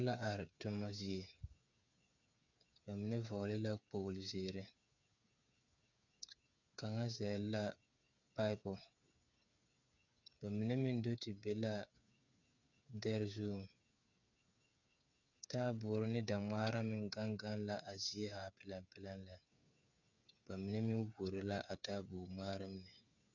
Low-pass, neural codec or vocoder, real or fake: 7.2 kHz; codec, 16 kHz, 8 kbps, FreqCodec, smaller model; fake